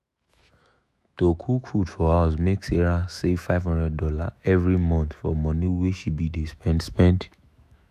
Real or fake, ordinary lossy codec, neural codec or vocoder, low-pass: fake; none; autoencoder, 48 kHz, 128 numbers a frame, DAC-VAE, trained on Japanese speech; 14.4 kHz